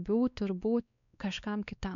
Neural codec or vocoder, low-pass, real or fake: codec, 16 kHz, 2 kbps, X-Codec, WavLM features, trained on Multilingual LibriSpeech; 7.2 kHz; fake